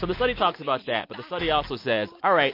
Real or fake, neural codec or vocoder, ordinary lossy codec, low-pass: real; none; MP3, 32 kbps; 5.4 kHz